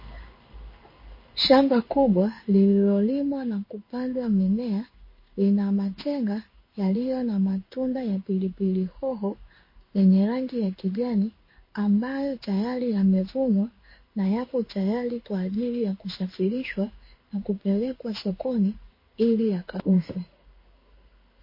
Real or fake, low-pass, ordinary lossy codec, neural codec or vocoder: fake; 5.4 kHz; MP3, 24 kbps; codec, 16 kHz in and 24 kHz out, 1 kbps, XY-Tokenizer